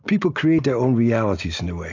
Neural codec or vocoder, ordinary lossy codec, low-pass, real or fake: none; AAC, 48 kbps; 7.2 kHz; real